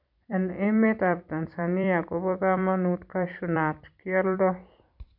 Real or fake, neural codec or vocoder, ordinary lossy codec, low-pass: fake; vocoder, 44.1 kHz, 128 mel bands every 256 samples, BigVGAN v2; none; 5.4 kHz